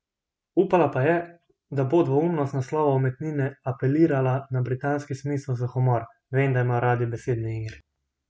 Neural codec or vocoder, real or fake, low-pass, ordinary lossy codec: none; real; none; none